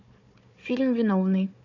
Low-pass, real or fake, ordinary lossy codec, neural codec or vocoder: 7.2 kHz; fake; none; codec, 16 kHz, 4 kbps, FunCodec, trained on Chinese and English, 50 frames a second